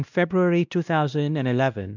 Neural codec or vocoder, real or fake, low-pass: codec, 16 kHz, 1 kbps, X-Codec, WavLM features, trained on Multilingual LibriSpeech; fake; 7.2 kHz